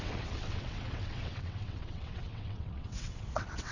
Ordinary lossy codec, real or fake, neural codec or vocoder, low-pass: none; fake; vocoder, 22.05 kHz, 80 mel bands, WaveNeXt; 7.2 kHz